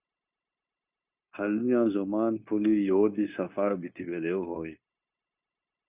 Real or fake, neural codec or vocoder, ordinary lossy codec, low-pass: fake; codec, 16 kHz, 0.9 kbps, LongCat-Audio-Codec; Opus, 64 kbps; 3.6 kHz